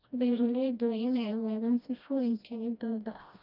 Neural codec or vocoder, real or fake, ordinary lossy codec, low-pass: codec, 16 kHz, 1 kbps, FreqCodec, smaller model; fake; none; 5.4 kHz